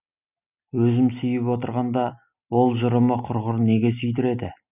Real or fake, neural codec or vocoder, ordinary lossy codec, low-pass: real; none; none; 3.6 kHz